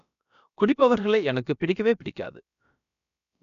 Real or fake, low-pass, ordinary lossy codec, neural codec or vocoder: fake; 7.2 kHz; none; codec, 16 kHz, about 1 kbps, DyCAST, with the encoder's durations